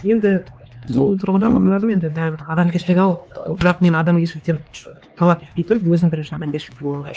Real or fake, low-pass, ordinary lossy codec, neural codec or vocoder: fake; none; none; codec, 16 kHz, 2 kbps, X-Codec, HuBERT features, trained on LibriSpeech